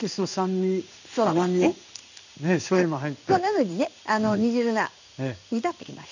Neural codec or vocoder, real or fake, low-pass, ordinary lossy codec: codec, 16 kHz in and 24 kHz out, 1 kbps, XY-Tokenizer; fake; 7.2 kHz; none